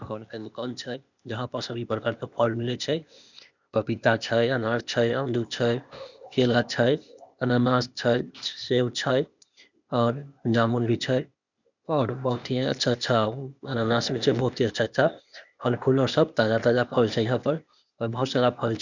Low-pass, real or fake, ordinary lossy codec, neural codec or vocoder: 7.2 kHz; fake; none; codec, 16 kHz, 0.8 kbps, ZipCodec